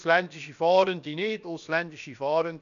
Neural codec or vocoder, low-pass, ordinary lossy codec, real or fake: codec, 16 kHz, about 1 kbps, DyCAST, with the encoder's durations; 7.2 kHz; none; fake